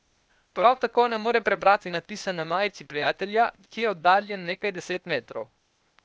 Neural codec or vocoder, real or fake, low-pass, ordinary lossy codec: codec, 16 kHz, 0.8 kbps, ZipCodec; fake; none; none